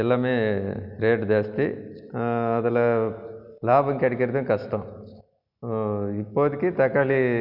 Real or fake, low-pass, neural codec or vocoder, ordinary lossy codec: real; 5.4 kHz; none; none